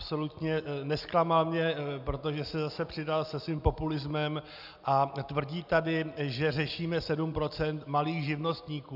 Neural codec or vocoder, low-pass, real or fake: none; 5.4 kHz; real